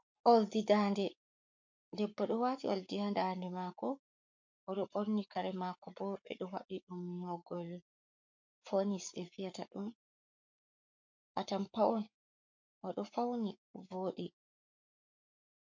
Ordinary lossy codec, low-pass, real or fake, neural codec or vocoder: MP3, 48 kbps; 7.2 kHz; fake; codec, 44.1 kHz, 7.8 kbps, Pupu-Codec